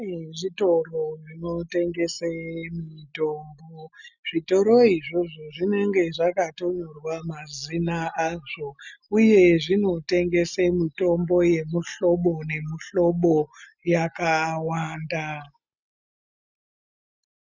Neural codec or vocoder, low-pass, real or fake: none; 7.2 kHz; real